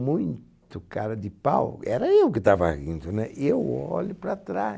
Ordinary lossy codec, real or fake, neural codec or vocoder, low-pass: none; real; none; none